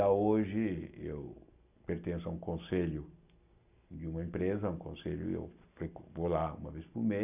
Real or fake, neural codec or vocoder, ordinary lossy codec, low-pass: real; none; none; 3.6 kHz